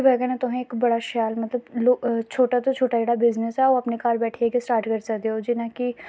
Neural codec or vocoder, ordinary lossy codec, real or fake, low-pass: none; none; real; none